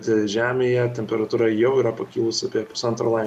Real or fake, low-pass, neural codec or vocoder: real; 14.4 kHz; none